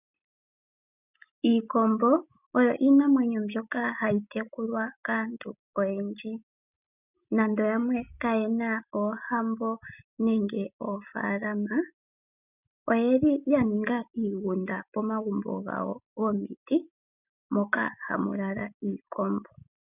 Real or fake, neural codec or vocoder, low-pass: real; none; 3.6 kHz